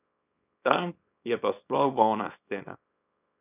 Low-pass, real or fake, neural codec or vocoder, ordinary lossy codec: 3.6 kHz; fake; codec, 24 kHz, 0.9 kbps, WavTokenizer, small release; none